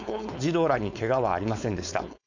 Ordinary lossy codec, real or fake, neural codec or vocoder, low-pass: none; fake; codec, 16 kHz, 4.8 kbps, FACodec; 7.2 kHz